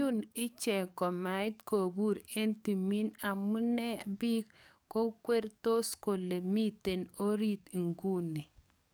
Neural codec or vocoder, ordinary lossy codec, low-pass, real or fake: codec, 44.1 kHz, 7.8 kbps, DAC; none; none; fake